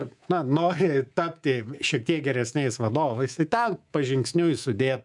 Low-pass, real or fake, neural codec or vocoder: 10.8 kHz; fake; codec, 24 kHz, 3.1 kbps, DualCodec